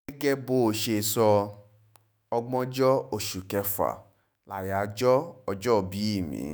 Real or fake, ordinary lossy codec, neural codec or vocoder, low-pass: fake; none; autoencoder, 48 kHz, 128 numbers a frame, DAC-VAE, trained on Japanese speech; none